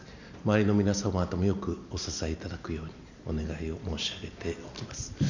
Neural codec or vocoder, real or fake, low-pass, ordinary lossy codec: none; real; 7.2 kHz; none